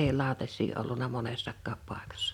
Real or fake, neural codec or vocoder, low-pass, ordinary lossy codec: real; none; 19.8 kHz; none